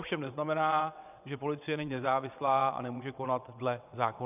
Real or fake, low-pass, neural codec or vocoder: fake; 3.6 kHz; vocoder, 22.05 kHz, 80 mel bands, WaveNeXt